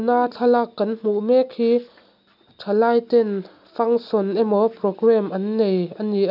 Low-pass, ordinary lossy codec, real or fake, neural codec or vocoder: 5.4 kHz; none; real; none